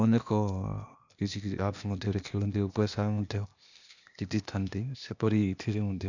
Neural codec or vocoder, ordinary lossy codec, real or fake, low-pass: codec, 16 kHz, 0.8 kbps, ZipCodec; none; fake; 7.2 kHz